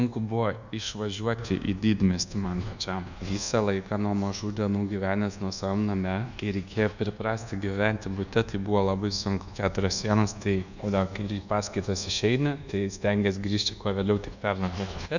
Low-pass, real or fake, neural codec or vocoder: 7.2 kHz; fake; codec, 24 kHz, 1.2 kbps, DualCodec